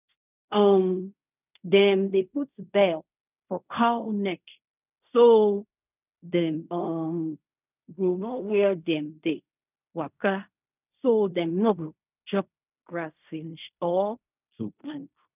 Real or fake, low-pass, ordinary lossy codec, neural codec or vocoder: fake; 3.6 kHz; none; codec, 16 kHz in and 24 kHz out, 0.4 kbps, LongCat-Audio-Codec, fine tuned four codebook decoder